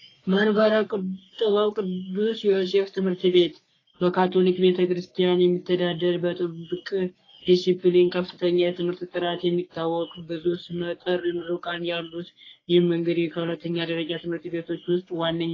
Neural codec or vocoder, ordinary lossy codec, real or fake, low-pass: codec, 44.1 kHz, 3.4 kbps, Pupu-Codec; AAC, 32 kbps; fake; 7.2 kHz